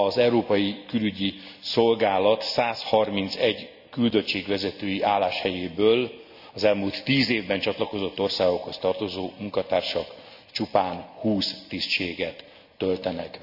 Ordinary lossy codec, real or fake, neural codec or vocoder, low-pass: none; real; none; 5.4 kHz